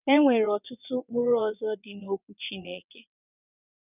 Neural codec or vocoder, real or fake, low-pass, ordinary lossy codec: vocoder, 22.05 kHz, 80 mel bands, WaveNeXt; fake; 3.6 kHz; none